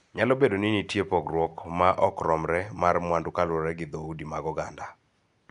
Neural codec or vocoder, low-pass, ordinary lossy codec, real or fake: none; 10.8 kHz; none; real